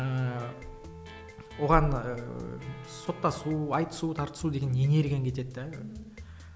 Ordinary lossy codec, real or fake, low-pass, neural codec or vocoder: none; real; none; none